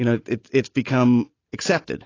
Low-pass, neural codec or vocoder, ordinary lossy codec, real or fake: 7.2 kHz; none; AAC, 32 kbps; real